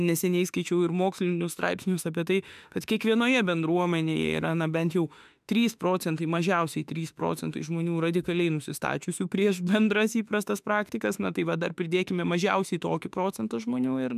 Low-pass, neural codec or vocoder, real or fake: 14.4 kHz; autoencoder, 48 kHz, 32 numbers a frame, DAC-VAE, trained on Japanese speech; fake